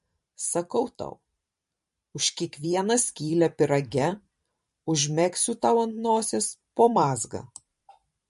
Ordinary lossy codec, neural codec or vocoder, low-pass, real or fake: MP3, 48 kbps; vocoder, 44.1 kHz, 128 mel bands every 512 samples, BigVGAN v2; 14.4 kHz; fake